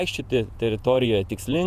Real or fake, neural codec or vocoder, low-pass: fake; vocoder, 48 kHz, 128 mel bands, Vocos; 14.4 kHz